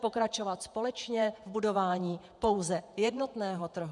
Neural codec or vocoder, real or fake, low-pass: vocoder, 48 kHz, 128 mel bands, Vocos; fake; 10.8 kHz